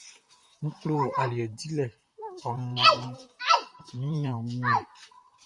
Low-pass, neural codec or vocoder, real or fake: 10.8 kHz; vocoder, 44.1 kHz, 128 mel bands, Pupu-Vocoder; fake